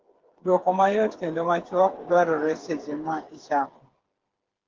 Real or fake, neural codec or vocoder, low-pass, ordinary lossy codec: fake; codec, 16 kHz, 8 kbps, FreqCodec, smaller model; 7.2 kHz; Opus, 16 kbps